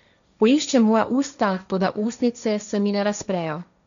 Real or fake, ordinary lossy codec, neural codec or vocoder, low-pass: fake; none; codec, 16 kHz, 1.1 kbps, Voila-Tokenizer; 7.2 kHz